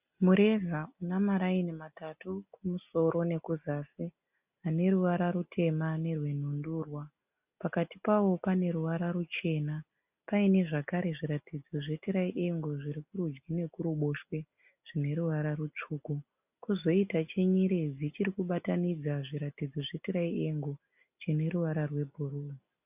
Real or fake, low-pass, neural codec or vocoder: real; 3.6 kHz; none